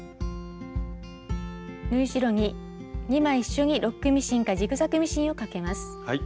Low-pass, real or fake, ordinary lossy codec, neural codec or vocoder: none; real; none; none